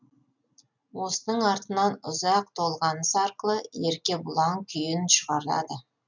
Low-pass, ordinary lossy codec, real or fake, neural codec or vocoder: 7.2 kHz; none; real; none